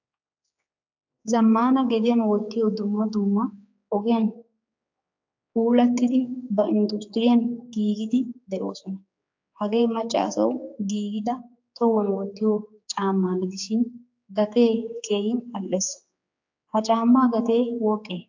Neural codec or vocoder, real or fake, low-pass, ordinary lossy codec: codec, 16 kHz, 4 kbps, X-Codec, HuBERT features, trained on general audio; fake; 7.2 kHz; AAC, 48 kbps